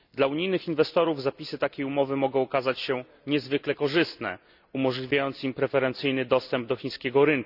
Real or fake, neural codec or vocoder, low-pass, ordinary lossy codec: real; none; 5.4 kHz; none